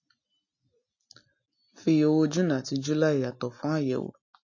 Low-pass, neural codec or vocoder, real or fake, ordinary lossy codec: 7.2 kHz; none; real; MP3, 32 kbps